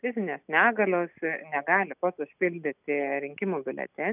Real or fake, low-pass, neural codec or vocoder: real; 3.6 kHz; none